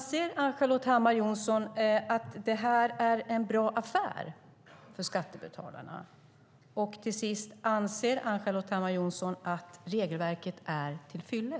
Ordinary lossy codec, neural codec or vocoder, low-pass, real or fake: none; none; none; real